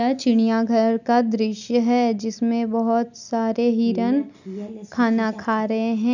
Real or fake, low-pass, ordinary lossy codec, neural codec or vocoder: real; 7.2 kHz; none; none